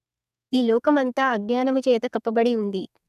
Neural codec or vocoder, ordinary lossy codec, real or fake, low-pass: codec, 32 kHz, 1.9 kbps, SNAC; none; fake; 14.4 kHz